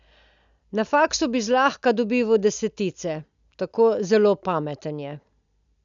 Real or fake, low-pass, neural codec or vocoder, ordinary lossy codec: real; 7.2 kHz; none; none